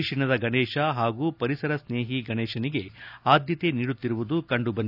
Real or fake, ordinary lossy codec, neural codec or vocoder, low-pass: real; none; none; 5.4 kHz